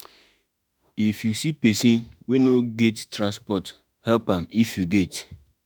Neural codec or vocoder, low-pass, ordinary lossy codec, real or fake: autoencoder, 48 kHz, 32 numbers a frame, DAC-VAE, trained on Japanese speech; none; none; fake